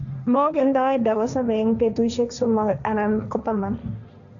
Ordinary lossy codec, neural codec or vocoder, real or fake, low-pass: none; codec, 16 kHz, 1.1 kbps, Voila-Tokenizer; fake; 7.2 kHz